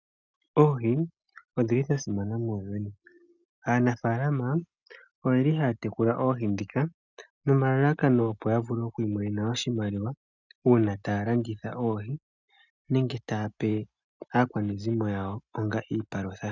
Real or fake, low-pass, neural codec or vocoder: real; 7.2 kHz; none